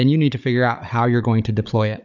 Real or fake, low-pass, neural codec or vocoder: fake; 7.2 kHz; codec, 16 kHz, 16 kbps, FunCodec, trained on Chinese and English, 50 frames a second